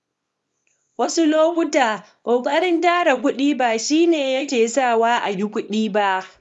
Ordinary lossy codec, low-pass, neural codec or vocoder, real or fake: none; none; codec, 24 kHz, 0.9 kbps, WavTokenizer, small release; fake